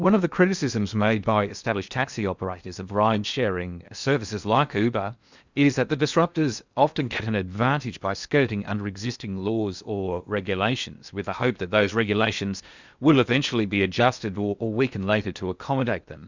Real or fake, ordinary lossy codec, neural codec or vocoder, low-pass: fake; Opus, 64 kbps; codec, 16 kHz in and 24 kHz out, 0.8 kbps, FocalCodec, streaming, 65536 codes; 7.2 kHz